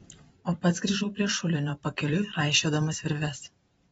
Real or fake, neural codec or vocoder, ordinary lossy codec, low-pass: fake; vocoder, 44.1 kHz, 128 mel bands every 512 samples, BigVGAN v2; AAC, 24 kbps; 19.8 kHz